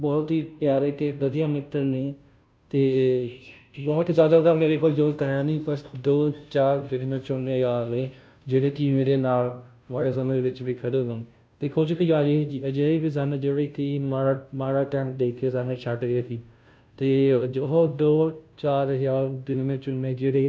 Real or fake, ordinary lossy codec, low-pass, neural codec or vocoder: fake; none; none; codec, 16 kHz, 0.5 kbps, FunCodec, trained on Chinese and English, 25 frames a second